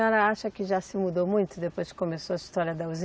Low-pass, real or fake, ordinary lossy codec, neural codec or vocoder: none; real; none; none